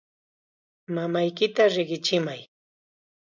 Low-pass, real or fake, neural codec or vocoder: 7.2 kHz; real; none